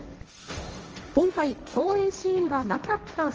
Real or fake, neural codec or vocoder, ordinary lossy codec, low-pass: fake; codec, 16 kHz, 1.1 kbps, Voila-Tokenizer; Opus, 24 kbps; 7.2 kHz